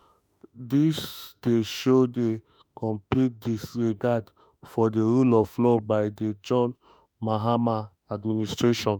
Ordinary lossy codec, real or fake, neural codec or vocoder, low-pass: none; fake; autoencoder, 48 kHz, 32 numbers a frame, DAC-VAE, trained on Japanese speech; none